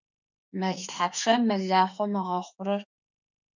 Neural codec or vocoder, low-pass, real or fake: autoencoder, 48 kHz, 32 numbers a frame, DAC-VAE, trained on Japanese speech; 7.2 kHz; fake